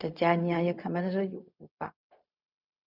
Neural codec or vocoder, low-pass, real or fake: codec, 16 kHz, 0.4 kbps, LongCat-Audio-Codec; 5.4 kHz; fake